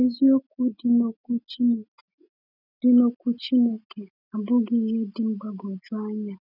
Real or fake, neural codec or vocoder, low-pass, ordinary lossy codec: real; none; 5.4 kHz; none